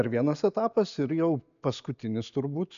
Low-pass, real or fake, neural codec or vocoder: 7.2 kHz; real; none